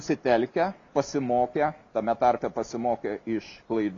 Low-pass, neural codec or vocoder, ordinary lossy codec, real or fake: 7.2 kHz; none; AAC, 48 kbps; real